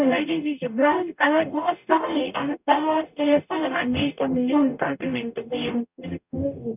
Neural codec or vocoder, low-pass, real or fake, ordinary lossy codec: codec, 44.1 kHz, 0.9 kbps, DAC; 3.6 kHz; fake; none